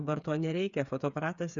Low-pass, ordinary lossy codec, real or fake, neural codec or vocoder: 7.2 kHz; Opus, 64 kbps; fake; codec, 16 kHz, 8 kbps, FreqCodec, smaller model